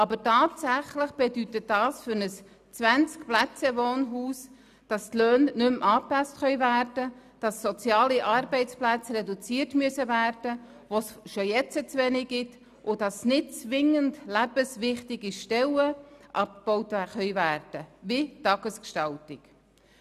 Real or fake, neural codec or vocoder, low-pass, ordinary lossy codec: real; none; 14.4 kHz; none